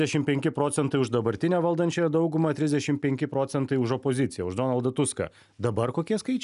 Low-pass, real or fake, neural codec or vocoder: 10.8 kHz; real; none